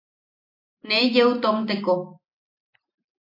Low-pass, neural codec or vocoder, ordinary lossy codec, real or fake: 5.4 kHz; none; AAC, 32 kbps; real